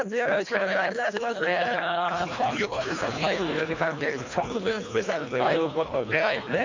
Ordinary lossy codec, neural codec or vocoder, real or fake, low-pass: MP3, 48 kbps; codec, 24 kHz, 1.5 kbps, HILCodec; fake; 7.2 kHz